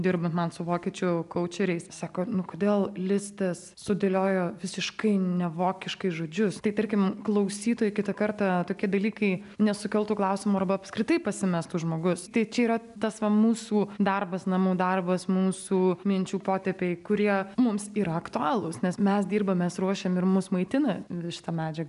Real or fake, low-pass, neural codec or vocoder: real; 10.8 kHz; none